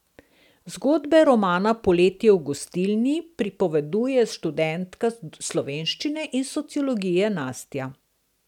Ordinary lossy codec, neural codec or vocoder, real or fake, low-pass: none; none; real; 19.8 kHz